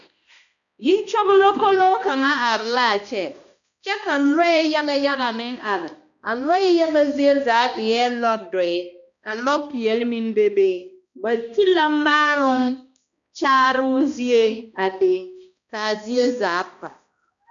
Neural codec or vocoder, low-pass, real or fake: codec, 16 kHz, 1 kbps, X-Codec, HuBERT features, trained on balanced general audio; 7.2 kHz; fake